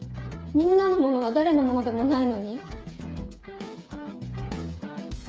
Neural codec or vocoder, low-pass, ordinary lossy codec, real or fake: codec, 16 kHz, 8 kbps, FreqCodec, smaller model; none; none; fake